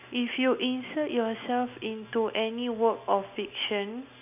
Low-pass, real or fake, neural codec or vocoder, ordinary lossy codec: 3.6 kHz; real; none; none